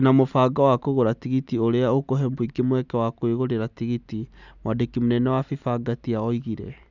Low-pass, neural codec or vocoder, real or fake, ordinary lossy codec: 7.2 kHz; none; real; none